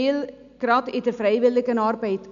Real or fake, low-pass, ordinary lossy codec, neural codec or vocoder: real; 7.2 kHz; none; none